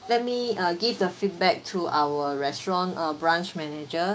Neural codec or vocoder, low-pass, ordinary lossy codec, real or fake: codec, 16 kHz, 6 kbps, DAC; none; none; fake